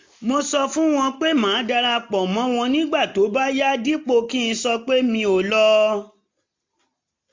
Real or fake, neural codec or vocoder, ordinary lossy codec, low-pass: real; none; MP3, 48 kbps; 7.2 kHz